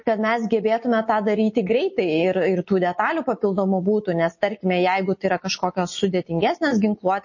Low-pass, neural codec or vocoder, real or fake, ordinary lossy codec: 7.2 kHz; none; real; MP3, 32 kbps